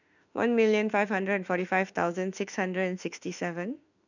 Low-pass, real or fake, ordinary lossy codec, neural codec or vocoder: 7.2 kHz; fake; none; autoencoder, 48 kHz, 32 numbers a frame, DAC-VAE, trained on Japanese speech